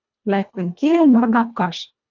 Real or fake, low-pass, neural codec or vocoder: fake; 7.2 kHz; codec, 24 kHz, 1.5 kbps, HILCodec